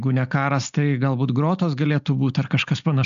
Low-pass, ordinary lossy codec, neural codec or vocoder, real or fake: 7.2 kHz; AAC, 96 kbps; none; real